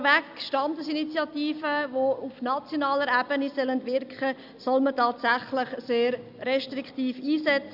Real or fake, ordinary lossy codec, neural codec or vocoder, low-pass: real; Opus, 64 kbps; none; 5.4 kHz